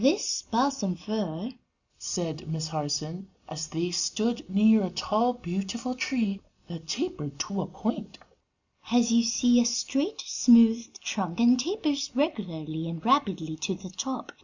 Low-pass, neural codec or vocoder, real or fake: 7.2 kHz; none; real